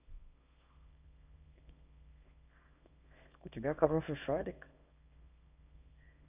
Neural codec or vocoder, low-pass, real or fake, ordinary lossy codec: codec, 24 kHz, 0.9 kbps, WavTokenizer, small release; 3.6 kHz; fake; none